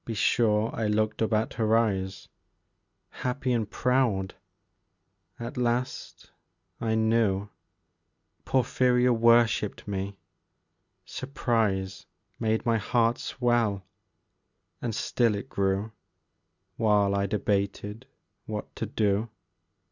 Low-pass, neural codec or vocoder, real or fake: 7.2 kHz; none; real